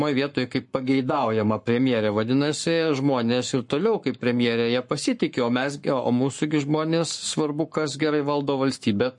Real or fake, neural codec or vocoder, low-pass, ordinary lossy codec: fake; codec, 44.1 kHz, 7.8 kbps, Pupu-Codec; 10.8 kHz; MP3, 48 kbps